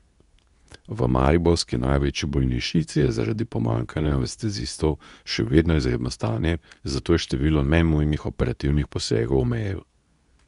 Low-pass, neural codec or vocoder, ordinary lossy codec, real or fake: 10.8 kHz; codec, 24 kHz, 0.9 kbps, WavTokenizer, medium speech release version 2; none; fake